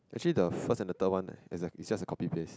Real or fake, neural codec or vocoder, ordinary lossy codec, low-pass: real; none; none; none